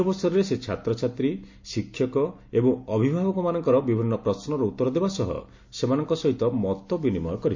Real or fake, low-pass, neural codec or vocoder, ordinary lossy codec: real; 7.2 kHz; none; MP3, 48 kbps